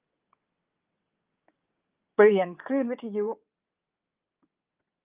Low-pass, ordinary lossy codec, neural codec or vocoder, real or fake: 3.6 kHz; Opus, 24 kbps; vocoder, 22.05 kHz, 80 mel bands, WaveNeXt; fake